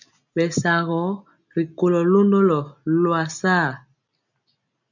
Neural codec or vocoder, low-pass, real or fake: none; 7.2 kHz; real